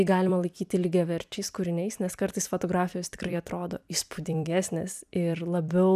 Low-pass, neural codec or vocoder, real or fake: 14.4 kHz; vocoder, 44.1 kHz, 128 mel bands every 256 samples, BigVGAN v2; fake